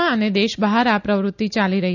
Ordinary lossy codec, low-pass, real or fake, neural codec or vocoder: none; 7.2 kHz; real; none